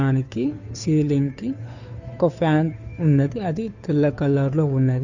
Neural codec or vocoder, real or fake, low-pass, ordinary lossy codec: codec, 16 kHz, 2 kbps, FunCodec, trained on Chinese and English, 25 frames a second; fake; 7.2 kHz; none